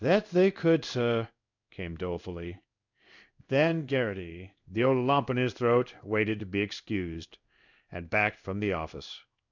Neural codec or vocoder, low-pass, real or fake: codec, 16 kHz in and 24 kHz out, 1 kbps, XY-Tokenizer; 7.2 kHz; fake